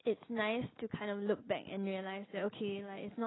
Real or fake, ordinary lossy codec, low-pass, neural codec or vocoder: real; AAC, 16 kbps; 7.2 kHz; none